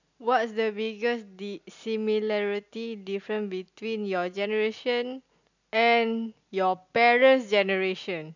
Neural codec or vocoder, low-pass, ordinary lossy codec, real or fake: none; 7.2 kHz; none; real